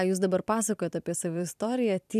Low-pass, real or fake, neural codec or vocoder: 14.4 kHz; fake; vocoder, 44.1 kHz, 128 mel bands every 256 samples, BigVGAN v2